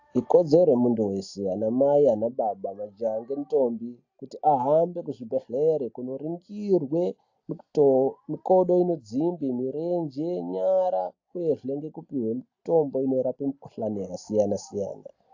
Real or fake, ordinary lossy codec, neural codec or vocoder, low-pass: real; AAC, 48 kbps; none; 7.2 kHz